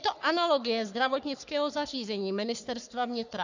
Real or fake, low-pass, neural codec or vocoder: fake; 7.2 kHz; codec, 44.1 kHz, 3.4 kbps, Pupu-Codec